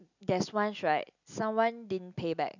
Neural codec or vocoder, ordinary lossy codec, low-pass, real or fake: none; none; 7.2 kHz; real